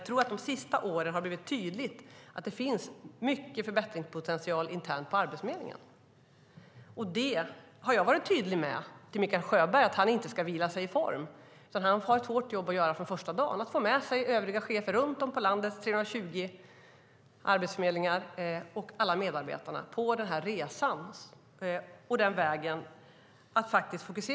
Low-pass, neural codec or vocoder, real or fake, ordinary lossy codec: none; none; real; none